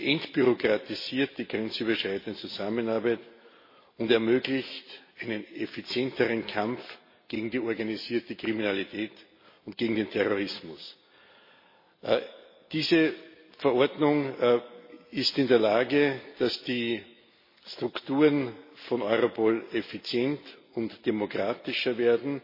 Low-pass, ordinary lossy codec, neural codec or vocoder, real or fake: 5.4 kHz; MP3, 24 kbps; none; real